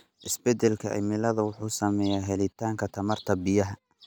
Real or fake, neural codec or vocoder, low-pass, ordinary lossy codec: real; none; none; none